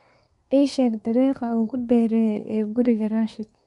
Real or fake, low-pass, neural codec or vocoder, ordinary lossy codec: fake; 10.8 kHz; codec, 24 kHz, 1 kbps, SNAC; none